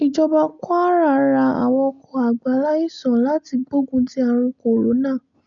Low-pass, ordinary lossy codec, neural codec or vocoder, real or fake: 7.2 kHz; none; none; real